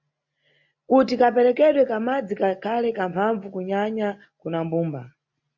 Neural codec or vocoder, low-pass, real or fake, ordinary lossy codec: none; 7.2 kHz; real; MP3, 48 kbps